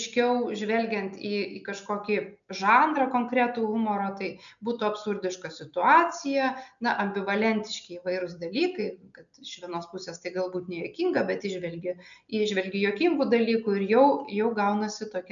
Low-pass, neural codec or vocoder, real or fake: 7.2 kHz; none; real